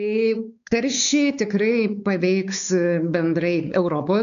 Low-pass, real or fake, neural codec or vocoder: 7.2 kHz; fake; codec, 16 kHz, 4 kbps, X-Codec, WavLM features, trained on Multilingual LibriSpeech